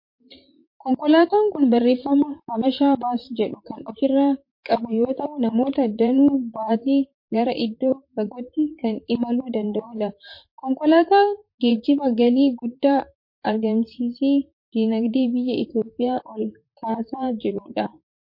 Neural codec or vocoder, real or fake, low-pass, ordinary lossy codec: vocoder, 44.1 kHz, 128 mel bands, Pupu-Vocoder; fake; 5.4 kHz; MP3, 32 kbps